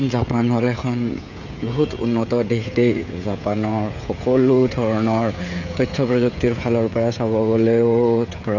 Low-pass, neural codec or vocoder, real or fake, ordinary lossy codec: 7.2 kHz; codec, 16 kHz, 16 kbps, FreqCodec, smaller model; fake; none